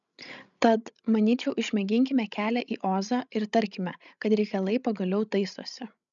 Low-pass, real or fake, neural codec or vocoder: 7.2 kHz; fake; codec, 16 kHz, 16 kbps, FreqCodec, larger model